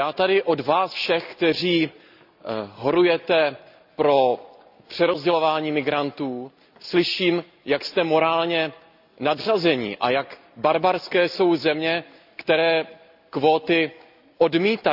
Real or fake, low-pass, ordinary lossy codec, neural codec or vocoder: real; 5.4 kHz; AAC, 48 kbps; none